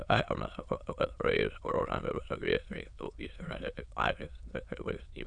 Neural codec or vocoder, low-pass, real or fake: autoencoder, 22.05 kHz, a latent of 192 numbers a frame, VITS, trained on many speakers; 9.9 kHz; fake